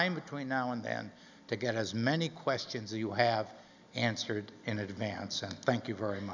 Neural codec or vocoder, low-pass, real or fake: none; 7.2 kHz; real